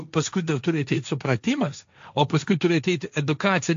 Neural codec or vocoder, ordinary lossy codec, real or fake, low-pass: codec, 16 kHz, 1.1 kbps, Voila-Tokenizer; AAC, 64 kbps; fake; 7.2 kHz